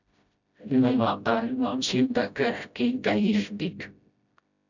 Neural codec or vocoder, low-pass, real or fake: codec, 16 kHz, 0.5 kbps, FreqCodec, smaller model; 7.2 kHz; fake